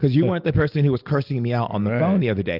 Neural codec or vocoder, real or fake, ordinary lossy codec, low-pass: none; real; Opus, 24 kbps; 5.4 kHz